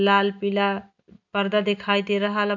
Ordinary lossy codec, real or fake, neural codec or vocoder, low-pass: none; real; none; 7.2 kHz